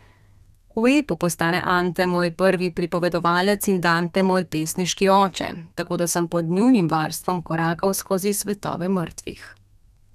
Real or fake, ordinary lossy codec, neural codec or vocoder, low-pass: fake; none; codec, 32 kHz, 1.9 kbps, SNAC; 14.4 kHz